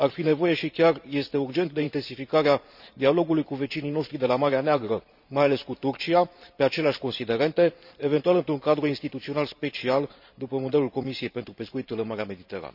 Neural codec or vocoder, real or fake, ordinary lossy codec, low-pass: vocoder, 44.1 kHz, 128 mel bands every 512 samples, BigVGAN v2; fake; none; 5.4 kHz